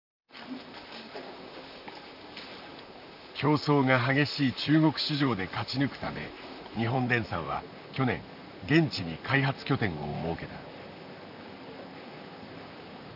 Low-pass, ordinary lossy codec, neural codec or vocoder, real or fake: 5.4 kHz; none; vocoder, 44.1 kHz, 128 mel bands, Pupu-Vocoder; fake